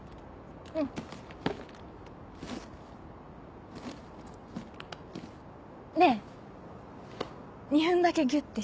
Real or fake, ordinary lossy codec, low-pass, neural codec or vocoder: real; none; none; none